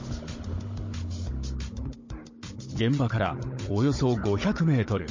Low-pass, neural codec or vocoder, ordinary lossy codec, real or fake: 7.2 kHz; codec, 16 kHz, 16 kbps, FunCodec, trained on Chinese and English, 50 frames a second; MP3, 32 kbps; fake